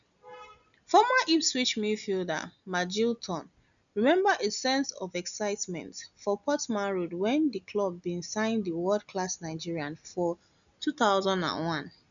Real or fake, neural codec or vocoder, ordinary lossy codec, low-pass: real; none; none; 7.2 kHz